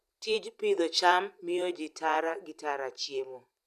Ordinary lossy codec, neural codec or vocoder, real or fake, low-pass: none; vocoder, 44.1 kHz, 128 mel bands every 512 samples, BigVGAN v2; fake; 14.4 kHz